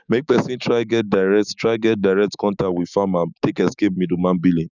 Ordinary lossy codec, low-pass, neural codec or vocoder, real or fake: none; 7.2 kHz; codec, 16 kHz, 6 kbps, DAC; fake